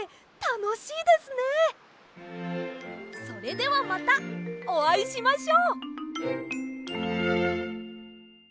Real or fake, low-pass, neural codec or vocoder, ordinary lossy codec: real; none; none; none